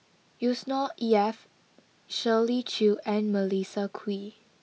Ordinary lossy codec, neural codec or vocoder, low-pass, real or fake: none; none; none; real